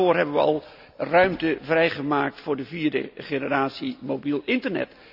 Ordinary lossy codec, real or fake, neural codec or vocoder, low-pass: none; real; none; 5.4 kHz